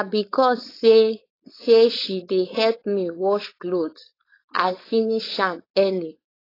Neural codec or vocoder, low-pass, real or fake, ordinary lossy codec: codec, 16 kHz, 4.8 kbps, FACodec; 5.4 kHz; fake; AAC, 24 kbps